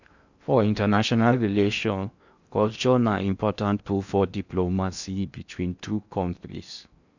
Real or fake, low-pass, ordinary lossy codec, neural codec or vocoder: fake; 7.2 kHz; none; codec, 16 kHz in and 24 kHz out, 0.8 kbps, FocalCodec, streaming, 65536 codes